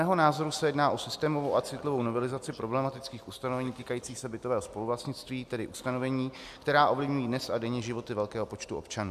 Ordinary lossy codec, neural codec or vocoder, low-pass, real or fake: AAC, 96 kbps; none; 14.4 kHz; real